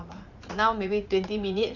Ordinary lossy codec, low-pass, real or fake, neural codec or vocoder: none; 7.2 kHz; fake; vocoder, 44.1 kHz, 80 mel bands, Vocos